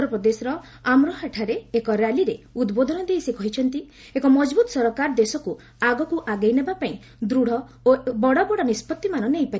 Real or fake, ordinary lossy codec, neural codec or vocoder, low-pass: real; none; none; none